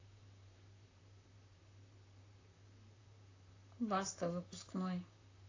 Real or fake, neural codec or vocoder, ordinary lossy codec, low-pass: fake; vocoder, 44.1 kHz, 128 mel bands, Pupu-Vocoder; AAC, 32 kbps; 7.2 kHz